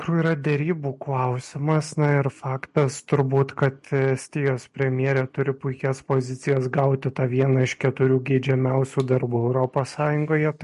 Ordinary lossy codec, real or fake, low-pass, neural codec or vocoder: MP3, 48 kbps; real; 14.4 kHz; none